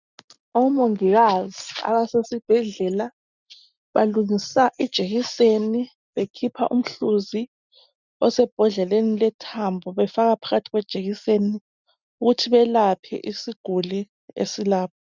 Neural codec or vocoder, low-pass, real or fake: none; 7.2 kHz; real